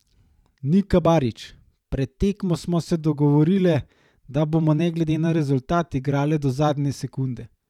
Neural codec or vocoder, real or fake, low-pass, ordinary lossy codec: vocoder, 44.1 kHz, 128 mel bands every 512 samples, BigVGAN v2; fake; 19.8 kHz; none